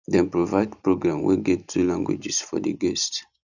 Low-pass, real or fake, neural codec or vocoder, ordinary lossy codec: 7.2 kHz; real; none; none